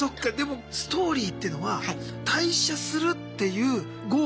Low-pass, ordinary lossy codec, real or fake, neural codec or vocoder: none; none; real; none